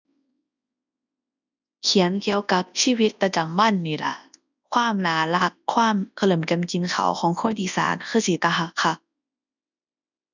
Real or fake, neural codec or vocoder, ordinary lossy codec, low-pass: fake; codec, 24 kHz, 0.9 kbps, WavTokenizer, large speech release; none; 7.2 kHz